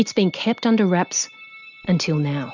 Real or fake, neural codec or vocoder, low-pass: real; none; 7.2 kHz